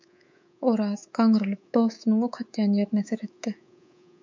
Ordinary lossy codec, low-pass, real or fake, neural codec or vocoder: MP3, 64 kbps; 7.2 kHz; fake; codec, 24 kHz, 3.1 kbps, DualCodec